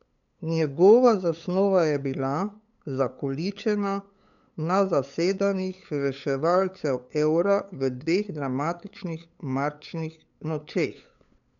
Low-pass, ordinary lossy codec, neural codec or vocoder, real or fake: 7.2 kHz; none; codec, 16 kHz, 8 kbps, FunCodec, trained on LibriTTS, 25 frames a second; fake